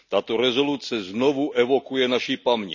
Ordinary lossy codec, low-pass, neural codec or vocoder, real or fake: none; 7.2 kHz; none; real